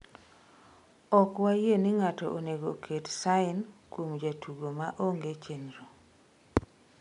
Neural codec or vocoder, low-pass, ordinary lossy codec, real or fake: none; 10.8 kHz; MP3, 64 kbps; real